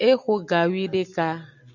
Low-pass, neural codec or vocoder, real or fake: 7.2 kHz; none; real